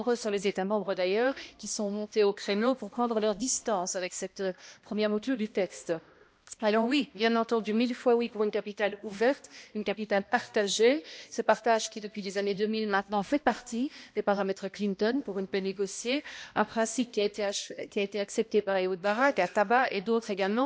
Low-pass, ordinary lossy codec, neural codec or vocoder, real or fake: none; none; codec, 16 kHz, 1 kbps, X-Codec, HuBERT features, trained on balanced general audio; fake